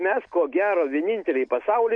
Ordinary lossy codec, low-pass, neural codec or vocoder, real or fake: MP3, 96 kbps; 9.9 kHz; none; real